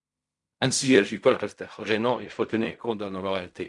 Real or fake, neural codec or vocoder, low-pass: fake; codec, 16 kHz in and 24 kHz out, 0.4 kbps, LongCat-Audio-Codec, fine tuned four codebook decoder; 10.8 kHz